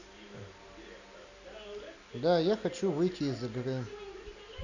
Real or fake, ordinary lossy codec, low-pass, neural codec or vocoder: real; none; 7.2 kHz; none